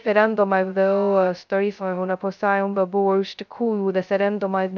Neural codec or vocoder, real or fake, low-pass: codec, 16 kHz, 0.2 kbps, FocalCodec; fake; 7.2 kHz